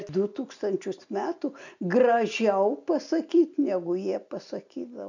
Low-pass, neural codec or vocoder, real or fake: 7.2 kHz; none; real